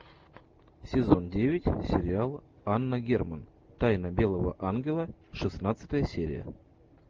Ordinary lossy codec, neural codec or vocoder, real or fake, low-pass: Opus, 24 kbps; none; real; 7.2 kHz